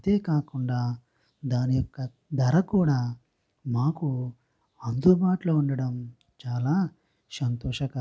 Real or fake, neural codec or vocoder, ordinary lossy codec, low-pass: real; none; none; none